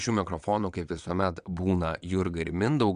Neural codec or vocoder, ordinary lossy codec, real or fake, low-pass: vocoder, 22.05 kHz, 80 mel bands, WaveNeXt; MP3, 96 kbps; fake; 9.9 kHz